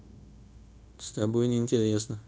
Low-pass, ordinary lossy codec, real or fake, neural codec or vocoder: none; none; fake; codec, 16 kHz, 0.9 kbps, LongCat-Audio-Codec